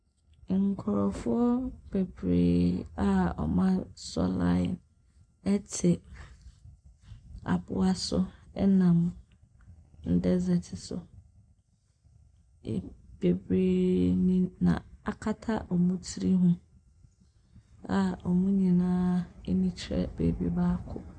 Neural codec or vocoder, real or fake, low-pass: none; real; 9.9 kHz